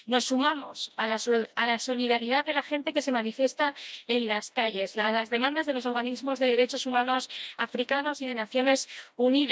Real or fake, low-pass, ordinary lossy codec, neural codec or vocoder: fake; none; none; codec, 16 kHz, 1 kbps, FreqCodec, smaller model